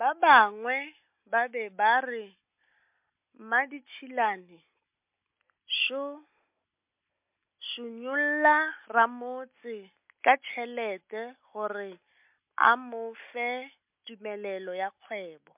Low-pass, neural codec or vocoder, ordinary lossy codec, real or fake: 3.6 kHz; none; MP3, 32 kbps; real